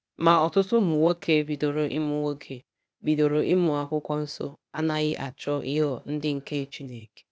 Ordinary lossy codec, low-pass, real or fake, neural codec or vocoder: none; none; fake; codec, 16 kHz, 0.8 kbps, ZipCodec